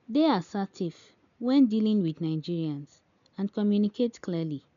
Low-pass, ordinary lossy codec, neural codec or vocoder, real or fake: 7.2 kHz; none; none; real